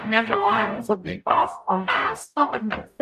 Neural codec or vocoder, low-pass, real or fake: codec, 44.1 kHz, 0.9 kbps, DAC; 14.4 kHz; fake